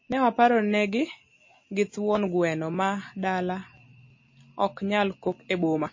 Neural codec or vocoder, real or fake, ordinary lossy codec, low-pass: none; real; MP3, 32 kbps; 7.2 kHz